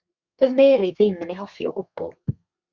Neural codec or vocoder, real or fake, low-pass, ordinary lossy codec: codec, 32 kHz, 1.9 kbps, SNAC; fake; 7.2 kHz; Opus, 64 kbps